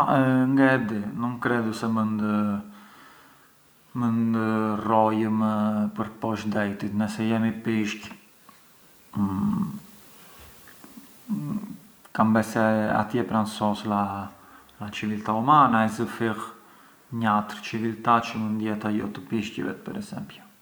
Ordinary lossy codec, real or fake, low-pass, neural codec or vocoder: none; real; none; none